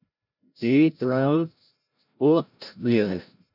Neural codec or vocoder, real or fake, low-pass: codec, 16 kHz, 0.5 kbps, FreqCodec, larger model; fake; 5.4 kHz